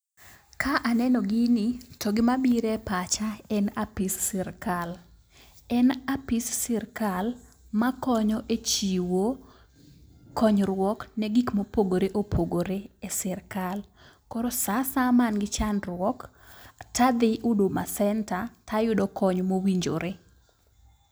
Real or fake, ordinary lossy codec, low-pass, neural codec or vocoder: real; none; none; none